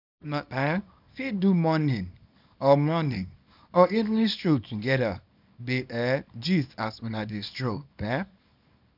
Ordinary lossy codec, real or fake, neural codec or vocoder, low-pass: none; fake; codec, 24 kHz, 0.9 kbps, WavTokenizer, small release; 5.4 kHz